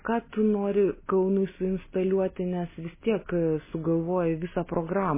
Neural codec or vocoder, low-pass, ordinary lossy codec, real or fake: none; 3.6 kHz; MP3, 16 kbps; real